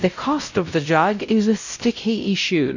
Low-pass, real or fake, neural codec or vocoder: 7.2 kHz; fake; codec, 16 kHz, 0.5 kbps, X-Codec, WavLM features, trained on Multilingual LibriSpeech